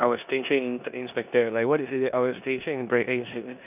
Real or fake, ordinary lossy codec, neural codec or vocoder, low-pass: fake; none; codec, 16 kHz in and 24 kHz out, 0.9 kbps, LongCat-Audio-Codec, four codebook decoder; 3.6 kHz